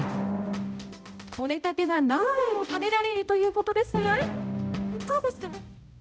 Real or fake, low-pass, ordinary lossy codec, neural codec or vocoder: fake; none; none; codec, 16 kHz, 0.5 kbps, X-Codec, HuBERT features, trained on balanced general audio